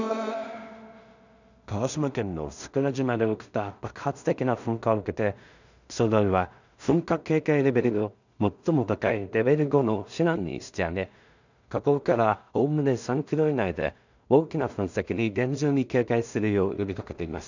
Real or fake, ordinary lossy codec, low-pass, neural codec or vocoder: fake; none; 7.2 kHz; codec, 16 kHz in and 24 kHz out, 0.4 kbps, LongCat-Audio-Codec, two codebook decoder